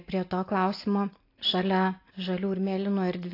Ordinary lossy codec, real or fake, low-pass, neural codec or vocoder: AAC, 32 kbps; real; 5.4 kHz; none